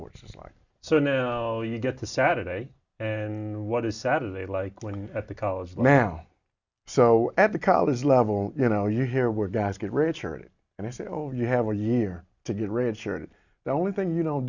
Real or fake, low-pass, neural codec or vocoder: real; 7.2 kHz; none